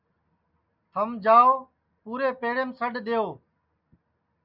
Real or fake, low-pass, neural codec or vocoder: real; 5.4 kHz; none